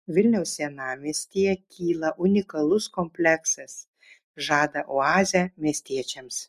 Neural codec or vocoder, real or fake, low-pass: none; real; 14.4 kHz